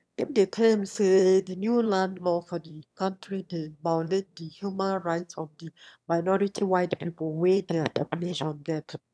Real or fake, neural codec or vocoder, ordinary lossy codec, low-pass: fake; autoencoder, 22.05 kHz, a latent of 192 numbers a frame, VITS, trained on one speaker; none; none